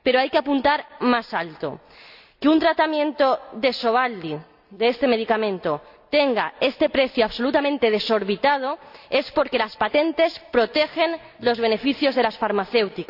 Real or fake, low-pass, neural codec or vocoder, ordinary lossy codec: real; 5.4 kHz; none; AAC, 48 kbps